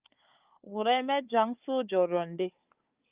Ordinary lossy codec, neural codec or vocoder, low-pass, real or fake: Opus, 24 kbps; codec, 16 kHz, 4 kbps, FunCodec, trained on Chinese and English, 50 frames a second; 3.6 kHz; fake